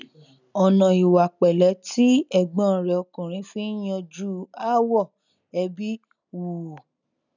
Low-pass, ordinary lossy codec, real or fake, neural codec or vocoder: 7.2 kHz; none; real; none